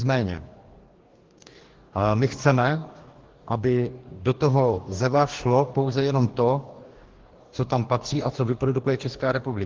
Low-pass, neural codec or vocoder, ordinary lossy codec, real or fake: 7.2 kHz; codec, 44.1 kHz, 2.6 kbps, DAC; Opus, 16 kbps; fake